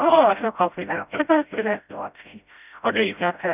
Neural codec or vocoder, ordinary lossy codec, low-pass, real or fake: codec, 16 kHz, 0.5 kbps, FreqCodec, smaller model; none; 3.6 kHz; fake